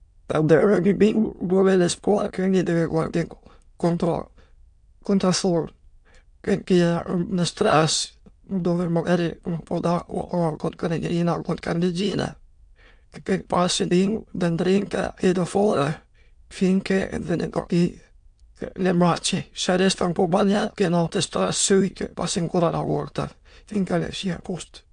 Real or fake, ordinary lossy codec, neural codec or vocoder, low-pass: fake; MP3, 64 kbps; autoencoder, 22.05 kHz, a latent of 192 numbers a frame, VITS, trained on many speakers; 9.9 kHz